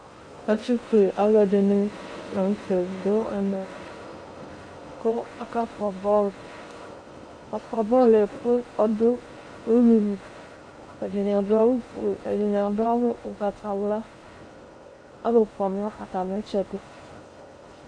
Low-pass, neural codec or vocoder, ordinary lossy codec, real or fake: 9.9 kHz; codec, 16 kHz in and 24 kHz out, 0.6 kbps, FocalCodec, streaming, 2048 codes; MP3, 48 kbps; fake